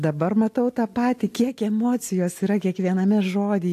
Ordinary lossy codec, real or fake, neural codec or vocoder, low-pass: AAC, 64 kbps; real; none; 14.4 kHz